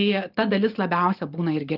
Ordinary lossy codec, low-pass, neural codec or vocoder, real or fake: Opus, 32 kbps; 5.4 kHz; none; real